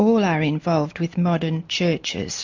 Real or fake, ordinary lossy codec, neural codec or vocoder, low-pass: real; MP3, 48 kbps; none; 7.2 kHz